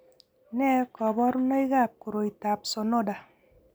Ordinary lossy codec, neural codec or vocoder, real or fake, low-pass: none; none; real; none